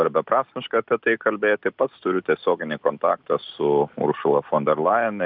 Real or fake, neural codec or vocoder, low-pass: real; none; 5.4 kHz